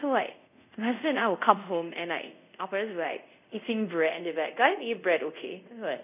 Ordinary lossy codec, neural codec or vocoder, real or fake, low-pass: none; codec, 24 kHz, 0.5 kbps, DualCodec; fake; 3.6 kHz